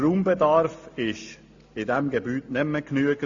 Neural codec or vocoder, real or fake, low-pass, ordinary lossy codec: none; real; 7.2 kHz; AAC, 48 kbps